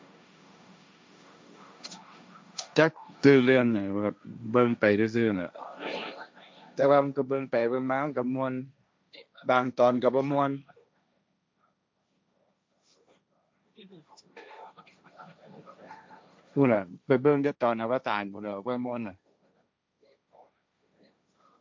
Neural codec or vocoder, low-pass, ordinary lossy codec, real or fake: codec, 16 kHz, 1.1 kbps, Voila-Tokenizer; none; none; fake